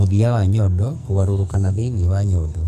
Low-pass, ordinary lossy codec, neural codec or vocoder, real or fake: 14.4 kHz; none; codec, 44.1 kHz, 2.6 kbps, SNAC; fake